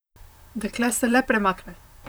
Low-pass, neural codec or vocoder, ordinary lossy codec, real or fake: none; vocoder, 44.1 kHz, 128 mel bands, Pupu-Vocoder; none; fake